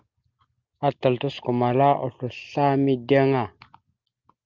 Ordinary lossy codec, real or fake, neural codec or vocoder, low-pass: Opus, 32 kbps; real; none; 7.2 kHz